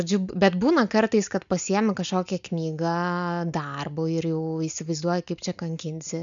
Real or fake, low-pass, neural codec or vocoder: real; 7.2 kHz; none